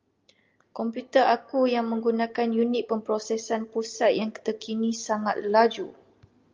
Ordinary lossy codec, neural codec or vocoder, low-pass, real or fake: Opus, 24 kbps; none; 7.2 kHz; real